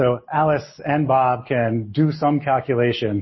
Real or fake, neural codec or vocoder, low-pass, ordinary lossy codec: real; none; 7.2 kHz; MP3, 24 kbps